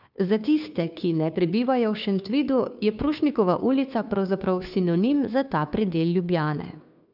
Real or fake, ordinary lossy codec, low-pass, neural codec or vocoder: fake; none; 5.4 kHz; codec, 16 kHz, 2 kbps, FunCodec, trained on Chinese and English, 25 frames a second